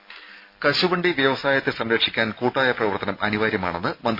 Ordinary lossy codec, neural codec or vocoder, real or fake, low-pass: MP3, 32 kbps; none; real; 5.4 kHz